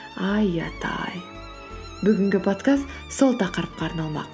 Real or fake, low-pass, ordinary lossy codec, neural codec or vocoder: real; none; none; none